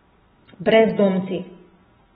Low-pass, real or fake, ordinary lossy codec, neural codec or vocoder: 10.8 kHz; real; AAC, 16 kbps; none